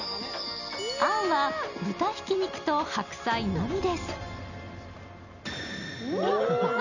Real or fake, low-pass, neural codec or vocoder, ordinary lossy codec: real; 7.2 kHz; none; none